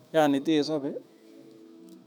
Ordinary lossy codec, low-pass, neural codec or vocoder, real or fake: none; 19.8 kHz; autoencoder, 48 kHz, 128 numbers a frame, DAC-VAE, trained on Japanese speech; fake